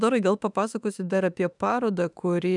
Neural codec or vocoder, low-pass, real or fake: autoencoder, 48 kHz, 32 numbers a frame, DAC-VAE, trained on Japanese speech; 10.8 kHz; fake